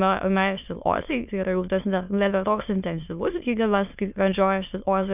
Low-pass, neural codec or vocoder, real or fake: 3.6 kHz; autoencoder, 22.05 kHz, a latent of 192 numbers a frame, VITS, trained on many speakers; fake